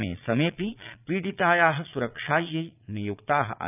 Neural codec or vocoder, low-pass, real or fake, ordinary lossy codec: vocoder, 22.05 kHz, 80 mel bands, Vocos; 3.6 kHz; fake; none